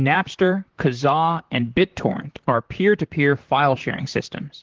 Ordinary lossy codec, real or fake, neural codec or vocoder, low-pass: Opus, 16 kbps; fake; vocoder, 44.1 kHz, 128 mel bands, Pupu-Vocoder; 7.2 kHz